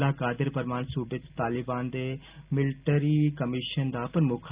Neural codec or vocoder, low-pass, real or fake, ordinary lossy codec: none; 3.6 kHz; real; Opus, 32 kbps